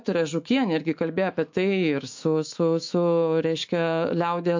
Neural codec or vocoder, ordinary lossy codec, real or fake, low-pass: autoencoder, 48 kHz, 128 numbers a frame, DAC-VAE, trained on Japanese speech; MP3, 48 kbps; fake; 7.2 kHz